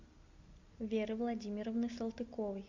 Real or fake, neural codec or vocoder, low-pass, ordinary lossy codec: real; none; 7.2 kHz; MP3, 64 kbps